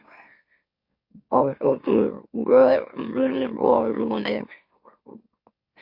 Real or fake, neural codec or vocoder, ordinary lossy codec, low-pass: fake; autoencoder, 44.1 kHz, a latent of 192 numbers a frame, MeloTTS; MP3, 32 kbps; 5.4 kHz